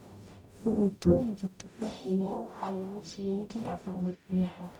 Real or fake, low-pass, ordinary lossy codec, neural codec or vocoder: fake; 19.8 kHz; none; codec, 44.1 kHz, 0.9 kbps, DAC